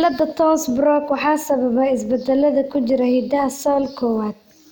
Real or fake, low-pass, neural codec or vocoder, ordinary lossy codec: real; 19.8 kHz; none; none